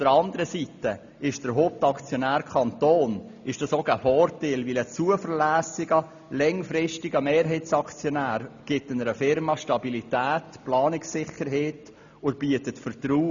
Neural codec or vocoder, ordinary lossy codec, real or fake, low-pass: none; none; real; 7.2 kHz